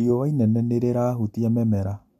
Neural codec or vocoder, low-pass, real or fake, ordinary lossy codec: none; 14.4 kHz; real; MP3, 64 kbps